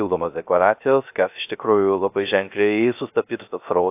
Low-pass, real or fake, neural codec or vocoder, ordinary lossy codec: 3.6 kHz; fake; codec, 16 kHz, 0.3 kbps, FocalCodec; AAC, 32 kbps